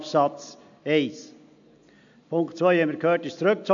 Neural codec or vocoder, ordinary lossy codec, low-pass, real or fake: none; AAC, 64 kbps; 7.2 kHz; real